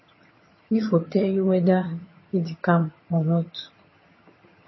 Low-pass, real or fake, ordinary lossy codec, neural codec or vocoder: 7.2 kHz; fake; MP3, 24 kbps; vocoder, 22.05 kHz, 80 mel bands, HiFi-GAN